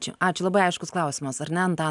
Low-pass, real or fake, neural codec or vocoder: 10.8 kHz; real; none